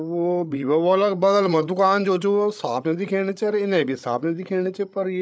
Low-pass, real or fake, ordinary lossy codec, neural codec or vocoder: none; fake; none; codec, 16 kHz, 8 kbps, FreqCodec, larger model